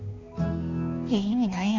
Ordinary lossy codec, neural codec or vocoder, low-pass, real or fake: none; codec, 16 kHz, 2 kbps, X-Codec, HuBERT features, trained on balanced general audio; 7.2 kHz; fake